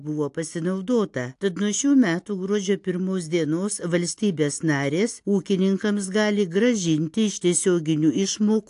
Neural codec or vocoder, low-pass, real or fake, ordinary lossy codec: none; 10.8 kHz; real; AAC, 64 kbps